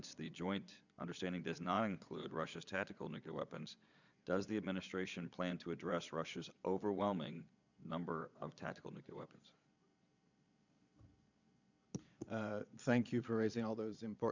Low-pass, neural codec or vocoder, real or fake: 7.2 kHz; vocoder, 22.05 kHz, 80 mel bands, Vocos; fake